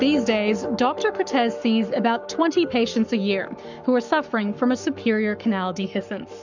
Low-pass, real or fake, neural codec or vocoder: 7.2 kHz; fake; codec, 44.1 kHz, 7.8 kbps, Pupu-Codec